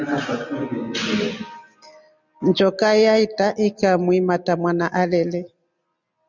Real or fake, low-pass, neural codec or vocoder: real; 7.2 kHz; none